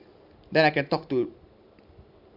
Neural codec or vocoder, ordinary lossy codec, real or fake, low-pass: none; MP3, 48 kbps; real; 5.4 kHz